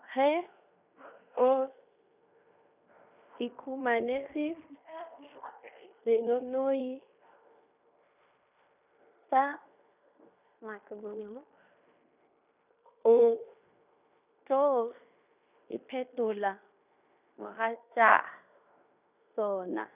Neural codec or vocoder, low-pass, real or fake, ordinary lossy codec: codec, 16 kHz in and 24 kHz out, 0.9 kbps, LongCat-Audio-Codec, fine tuned four codebook decoder; 3.6 kHz; fake; none